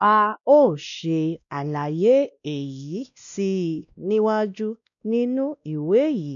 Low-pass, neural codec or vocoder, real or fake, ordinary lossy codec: 7.2 kHz; codec, 16 kHz, 1 kbps, X-Codec, WavLM features, trained on Multilingual LibriSpeech; fake; none